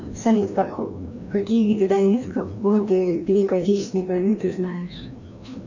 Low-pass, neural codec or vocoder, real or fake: 7.2 kHz; codec, 16 kHz, 1 kbps, FreqCodec, larger model; fake